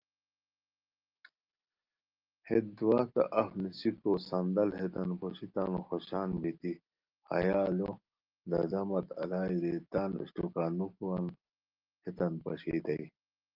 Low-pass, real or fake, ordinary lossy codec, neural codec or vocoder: 5.4 kHz; real; Opus, 32 kbps; none